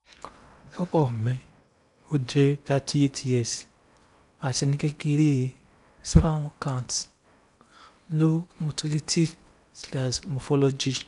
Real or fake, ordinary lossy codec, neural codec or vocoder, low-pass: fake; none; codec, 16 kHz in and 24 kHz out, 0.8 kbps, FocalCodec, streaming, 65536 codes; 10.8 kHz